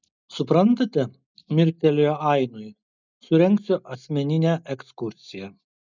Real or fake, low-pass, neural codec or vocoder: real; 7.2 kHz; none